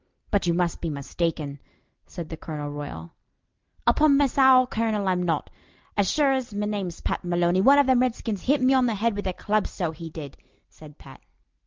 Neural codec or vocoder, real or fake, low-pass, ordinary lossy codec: none; real; 7.2 kHz; Opus, 24 kbps